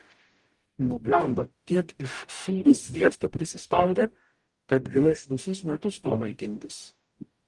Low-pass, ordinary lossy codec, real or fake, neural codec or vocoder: 10.8 kHz; Opus, 24 kbps; fake; codec, 44.1 kHz, 0.9 kbps, DAC